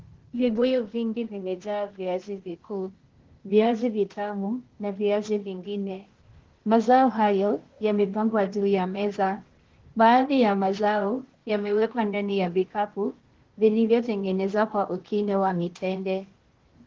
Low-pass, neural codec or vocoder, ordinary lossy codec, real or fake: 7.2 kHz; codec, 16 kHz, 0.8 kbps, ZipCodec; Opus, 16 kbps; fake